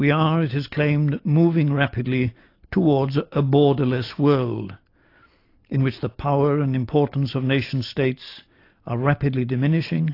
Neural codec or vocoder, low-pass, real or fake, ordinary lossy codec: none; 5.4 kHz; real; AAC, 32 kbps